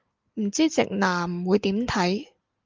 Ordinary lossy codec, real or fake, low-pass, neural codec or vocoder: Opus, 24 kbps; real; 7.2 kHz; none